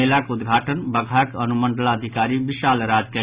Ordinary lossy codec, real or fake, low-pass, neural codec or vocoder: Opus, 64 kbps; real; 3.6 kHz; none